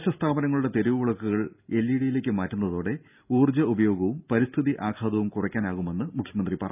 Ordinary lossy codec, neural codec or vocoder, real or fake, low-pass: none; none; real; 3.6 kHz